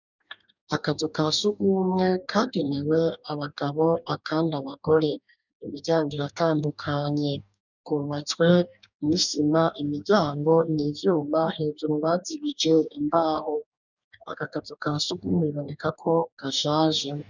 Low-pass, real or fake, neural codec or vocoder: 7.2 kHz; fake; codec, 44.1 kHz, 2.6 kbps, DAC